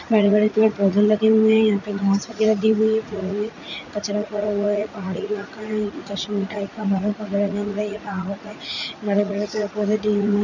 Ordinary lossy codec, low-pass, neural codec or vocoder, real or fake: none; 7.2 kHz; vocoder, 44.1 kHz, 80 mel bands, Vocos; fake